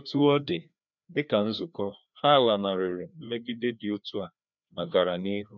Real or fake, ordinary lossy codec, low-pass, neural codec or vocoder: fake; none; 7.2 kHz; codec, 16 kHz, 2 kbps, FreqCodec, larger model